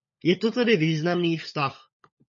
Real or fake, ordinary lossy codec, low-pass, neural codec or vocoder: fake; MP3, 32 kbps; 7.2 kHz; codec, 16 kHz, 16 kbps, FunCodec, trained on LibriTTS, 50 frames a second